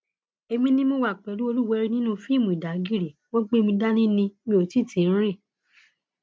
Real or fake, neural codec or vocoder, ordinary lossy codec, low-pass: real; none; none; none